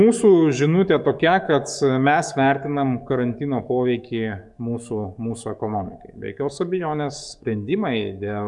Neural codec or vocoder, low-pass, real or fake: codec, 44.1 kHz, 7.8 kbps, DAC; 10.8 kHz; fake